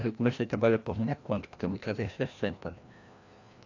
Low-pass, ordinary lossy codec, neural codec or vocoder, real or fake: 7.2 kHz; AAC, 48 kbps; codec, 16 kHz, 1 kbps, FreqCodec, larger model; fake